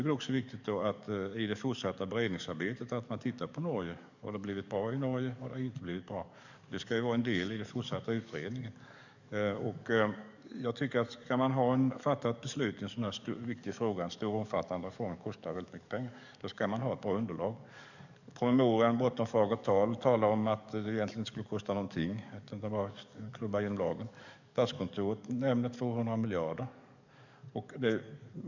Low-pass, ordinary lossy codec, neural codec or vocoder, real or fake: 7.2 kHz; none; codec, 44.1 kHz, 7.8 kbps, DAC; fake